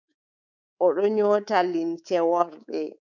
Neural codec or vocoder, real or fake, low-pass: codec, 24 kHz, 3.1 kbps, DualCodec; fake; 7.2 kHz